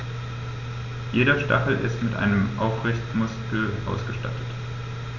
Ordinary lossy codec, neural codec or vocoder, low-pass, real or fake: none; none; 7.2 kHz; real